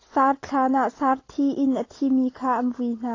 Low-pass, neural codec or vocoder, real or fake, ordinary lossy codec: 7.2 kHz; none; real; AAC, 32 kbps